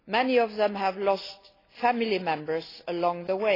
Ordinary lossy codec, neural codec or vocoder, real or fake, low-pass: AAC, 24 kbps; none; real; 5.4 kHz